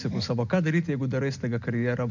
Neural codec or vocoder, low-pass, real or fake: none; 7.2 kHz; real